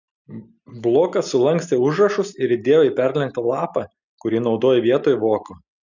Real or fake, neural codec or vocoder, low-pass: real; none; 7.2 kHz